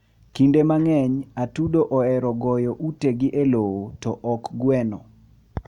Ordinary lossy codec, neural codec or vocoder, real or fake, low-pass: none; none; real; 19.8 kHz